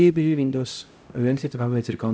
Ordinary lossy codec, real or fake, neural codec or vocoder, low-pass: none; fake; codec, 16 kHz, 0.5 kbps, X-Codec, HuBERT features, trained on LibriSpeech; none